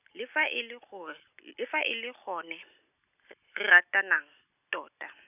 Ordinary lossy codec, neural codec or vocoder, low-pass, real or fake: none; none; 3.6 kHz; real